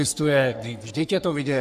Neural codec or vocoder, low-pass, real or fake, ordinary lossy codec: codec, 44.1 kHz, 3.4 kbps, Pupu-Codec; 14.4 kHz; fake; AAC, 96 kbps